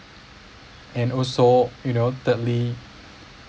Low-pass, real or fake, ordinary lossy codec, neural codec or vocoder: none; real; none; none